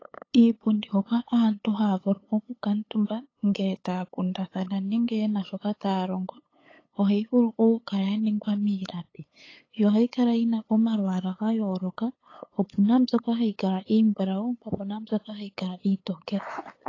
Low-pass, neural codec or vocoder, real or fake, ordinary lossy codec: 7.2 kHz; codec, 16 kHz, 8 kbps, FunCodec, trained on LibriTTS, 25 frames a second; fake; AAC, 32 kbps